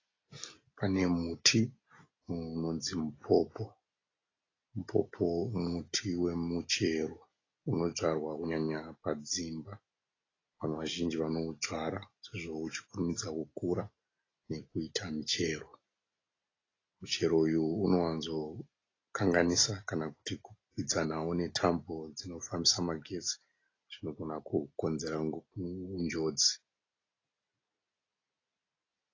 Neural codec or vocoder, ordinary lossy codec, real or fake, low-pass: none; AAC, 32 kbps; real; 7.2 kHz